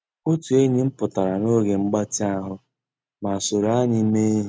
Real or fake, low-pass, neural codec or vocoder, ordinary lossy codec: real; none; none; none